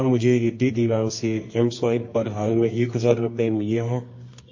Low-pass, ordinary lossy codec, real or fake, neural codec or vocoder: 7.2 kHz; MP3, 32 kbps; fake; codec, 24 kHz, 0.9 kbps, WavTokenizer, medium music audio release